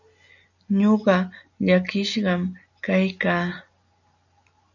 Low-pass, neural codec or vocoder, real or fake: 7.2 kHz; none; real